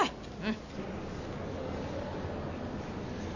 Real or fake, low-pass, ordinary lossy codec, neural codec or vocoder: real; 7.2 kHz; none; none